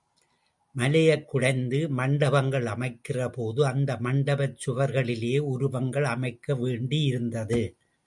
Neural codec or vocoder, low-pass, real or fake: none; 10.8 kHz; real